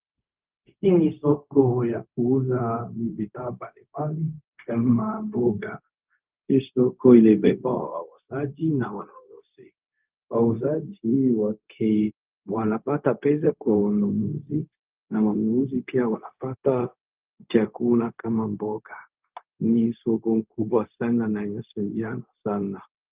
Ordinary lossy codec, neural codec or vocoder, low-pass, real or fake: Opus, 32 kbps; codec, 16 kHz, 0.4 kbps, LongCat-Audio-Codec; 3.6 kHz; fake